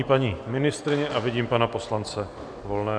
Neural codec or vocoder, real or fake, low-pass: none; real; 9.9 kHz